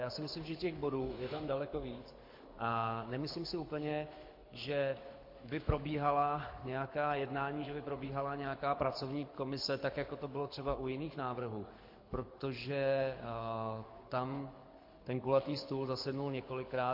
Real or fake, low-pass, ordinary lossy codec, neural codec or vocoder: fake; 5.4 kHz; MP3, 32 kbps; codec, 24 kHz, 6 kbps, HILCodec